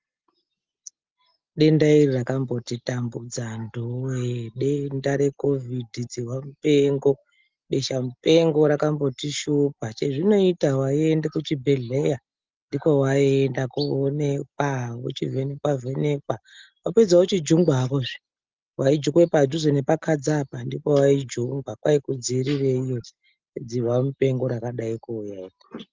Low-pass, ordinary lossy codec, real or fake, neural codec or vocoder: 7.2 kHz; Opus, 32 kbps; real; none